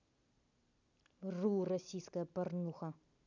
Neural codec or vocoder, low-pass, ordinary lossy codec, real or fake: none; 7.2 kHz; none; real